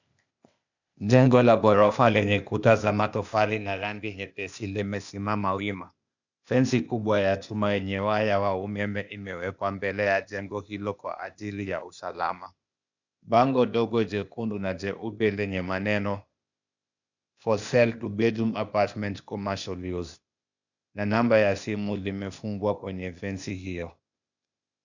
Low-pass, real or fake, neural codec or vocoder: 7.2 kHz; fake; codec, 16 kHz, 0.8 kbps, ZipCodec